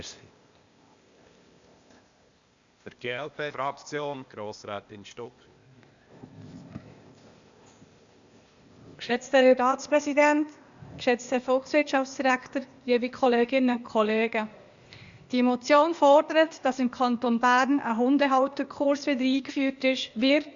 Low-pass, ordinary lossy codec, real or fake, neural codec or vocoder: 7.2 kHz; Opus, 64 kbps; fake; codec, 16 kHz, 0.8 kbps, ZipCodec